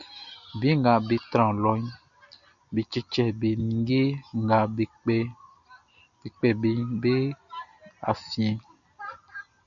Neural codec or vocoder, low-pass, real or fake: none; 7.2 kHz; real